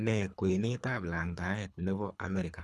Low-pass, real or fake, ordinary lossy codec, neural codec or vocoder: none; fake; none; codec, 24 kHz, 3 kbps, HILCodec